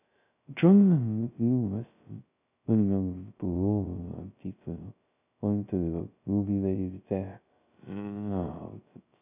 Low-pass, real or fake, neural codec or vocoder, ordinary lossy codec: 3.6 kHz; fake; codec, 16 kHz, 0.2 kbps, FocalCodec; none